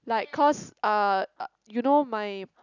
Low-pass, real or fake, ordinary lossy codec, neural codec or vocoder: 7.2 kHz; real; none; none